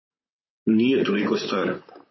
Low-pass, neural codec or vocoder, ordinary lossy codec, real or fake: 7.2 kHz; vocoder, 44.1 kHz, 128 mel bands, Pupu-Vocoder; MP3, 24 kbps; fake